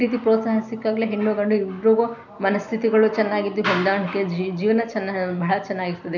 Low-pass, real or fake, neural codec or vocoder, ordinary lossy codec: 7.2 kHz; real; none; none